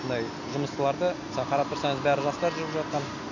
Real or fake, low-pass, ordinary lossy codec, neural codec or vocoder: real; 7.2 kHz; none; none